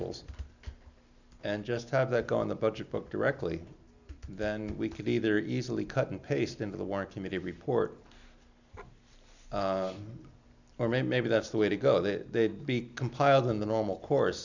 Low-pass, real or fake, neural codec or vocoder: 7.2 kHz; real; none